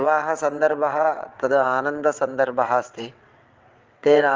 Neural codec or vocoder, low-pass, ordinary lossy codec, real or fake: vocoder, 22.05 kHz, 80 mel bands, WaveNeXt; 7.2 kHz; Opus, 24 kbps; fake